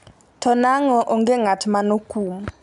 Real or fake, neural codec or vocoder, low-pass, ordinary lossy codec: real; none; 10.8 kHz; none